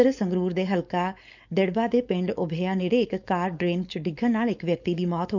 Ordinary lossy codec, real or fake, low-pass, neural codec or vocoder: none; fake; 7.2 kHz; codec, 16 kHz, 4.8 kbps, FACodec